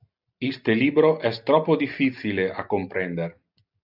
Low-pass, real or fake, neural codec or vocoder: 5.4 kHz; real; none